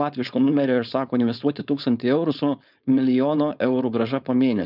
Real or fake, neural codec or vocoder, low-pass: fake; codec, 16 kHz, 4.8 kbps, FACodec; 5.4 kHz